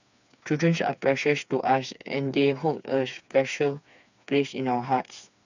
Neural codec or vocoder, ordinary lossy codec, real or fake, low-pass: codec, 16 kHz, 4 kbps, FreqCodec, smaller model; none; fake; 7.2 kHz